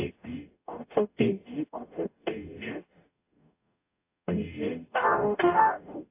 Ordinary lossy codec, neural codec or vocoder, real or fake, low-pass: AAC, 24 kbps; codec, 44.1 kHz, 0.9 kbps, DAC; fake; 3.6 kHz